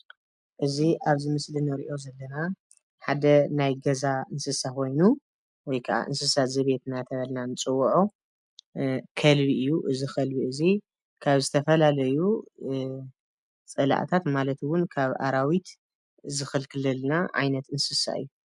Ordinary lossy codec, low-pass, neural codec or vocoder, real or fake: MP3, 96 kbps; 10.8 kHz; none; real